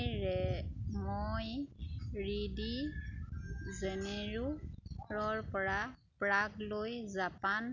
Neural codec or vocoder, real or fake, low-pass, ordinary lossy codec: none; real; 7.2 kHz; none